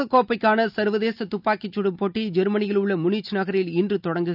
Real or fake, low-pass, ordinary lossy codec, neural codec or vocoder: real; 5.4 kHz; none; none